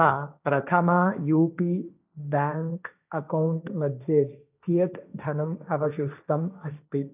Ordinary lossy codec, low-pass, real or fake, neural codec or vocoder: none; 3.6 kHz; fake; codec, 16 kHz, 1.1 kbps, Voila-Tokenizer